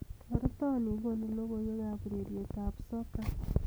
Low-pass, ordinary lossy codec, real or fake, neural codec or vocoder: none; none; real; none